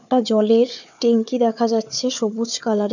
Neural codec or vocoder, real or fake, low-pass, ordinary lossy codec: codec, 16 kHz, 4 kbps, FunCodec, trained on Chinese and English, 50 frames a second; fake; 7.2 kHz; none